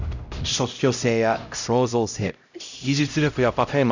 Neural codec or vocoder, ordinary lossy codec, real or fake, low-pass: codec, 16 kHz, 0.5 kbps, X-Codec, HuBERT features, trained on LibriSpeech; none; fake; 7.2 kHz